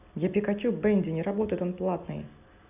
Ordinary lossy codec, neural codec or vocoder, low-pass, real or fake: none; none; 3.6 kHz; real